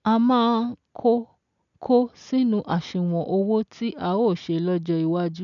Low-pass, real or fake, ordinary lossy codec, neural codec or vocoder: 7.2 kHz; real; none; none